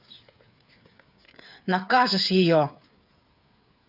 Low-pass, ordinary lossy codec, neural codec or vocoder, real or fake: 5.4 kHz; none; codec, 16 kHz, 8 kbps, FreqCodec, smaller model; fake